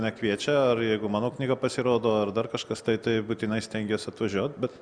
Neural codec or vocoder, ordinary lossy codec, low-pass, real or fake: none; Opus, 64 kbps; 9.9 kHz; real